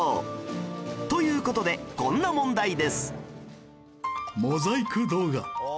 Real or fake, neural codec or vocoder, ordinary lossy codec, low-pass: real; none; none; none